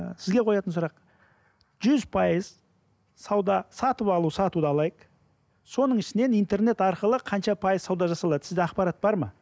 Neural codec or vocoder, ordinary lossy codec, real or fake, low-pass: none; none; real; none